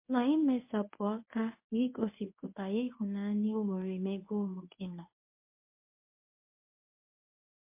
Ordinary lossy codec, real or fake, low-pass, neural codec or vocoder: MP3, 24 kbps; fake; 3.6 kHz; codec, 24 kHz, 0.9 kbps, WavTokenizer, medium speech release version 1